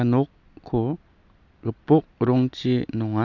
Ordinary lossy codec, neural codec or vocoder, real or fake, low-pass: none; none; real; 7.2 kHz